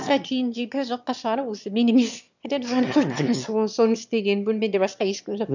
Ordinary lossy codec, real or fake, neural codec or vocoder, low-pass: none; fake; autoencoder, 22.05 kHz, a latent of 192 numbers a frame, VITS, trained on one speaker; 7.2 kHz